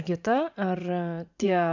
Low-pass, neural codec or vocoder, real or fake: 7.2 kHz; vocoder, 44.1 kHz, 80 mel bands, Vocos; fake